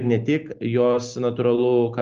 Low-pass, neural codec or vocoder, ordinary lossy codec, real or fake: 7.2 kHz; none; Opus, 32 kbps; real